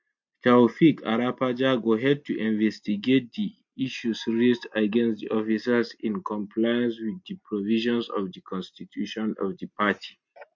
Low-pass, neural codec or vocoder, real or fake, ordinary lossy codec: 7.2 kHz; none; real; MP3, 48 kbps